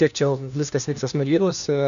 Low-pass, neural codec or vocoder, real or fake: 7.2 kHz; codec, 16 kHz, 1 kbps, FunCodec, trained on LibriTTS, 50 frames a second; fake